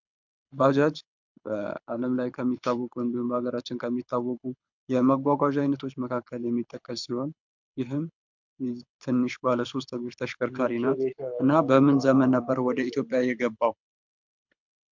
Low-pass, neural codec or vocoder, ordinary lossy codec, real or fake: 7.2 kHz; codec, 24 kHz, 6 kbps, HILCodec; MP3, 64 kbps; fake